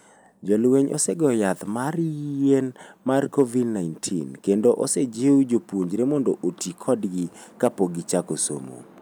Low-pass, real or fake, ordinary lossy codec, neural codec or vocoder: none; real; none; none